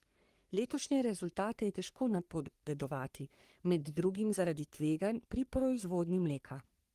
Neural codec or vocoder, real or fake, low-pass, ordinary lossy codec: codec, 44.1 kHz, 3.4 kbps, Pupu-Codec; fake; 14.4 kHz; Opus, 24 kbps